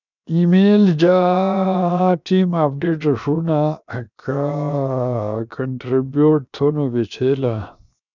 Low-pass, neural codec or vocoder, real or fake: 7.2 kHz; codec, 16 kHz, 0.7 kbps, FocalCodec; fake